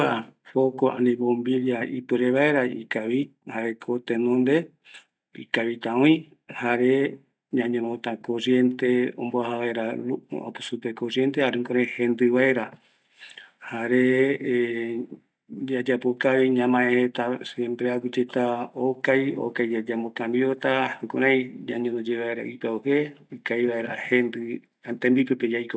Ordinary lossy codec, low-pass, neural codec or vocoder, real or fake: none; none; none; real